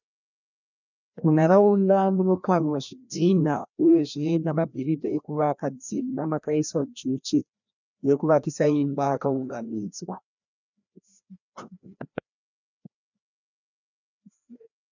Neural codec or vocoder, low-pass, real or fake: codec, 16 kHz, 1 kbps, FreqCodec, larger model; 7.2 kHz; fake